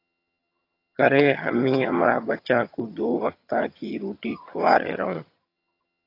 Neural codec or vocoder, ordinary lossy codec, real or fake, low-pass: vocoder, 22.05 kHz, 80 mel bands, HiFi-GAN; AAC, 32 kbps; fake; 5.4 kHz